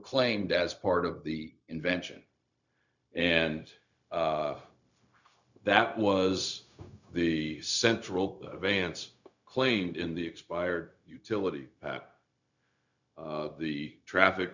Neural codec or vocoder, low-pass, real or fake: codec, 16 kHz, 0.4 kbps, LongCat-Audio-Codec; 7.2 kHz; fake